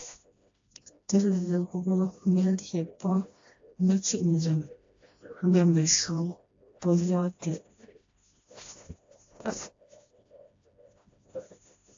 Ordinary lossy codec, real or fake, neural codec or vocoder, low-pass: AAC, 48 kbps; fake; codec, 16 kHz, 1 kbps, FreqCodec, smaller model; 7.2 kHz